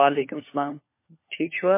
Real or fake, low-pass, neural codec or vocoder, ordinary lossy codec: fake; 3.6 kHz; codec, 16 kHz, 4 kbps, FunCodec, trained on LibriTTS, 50 frames a second; MP3, 24 kbps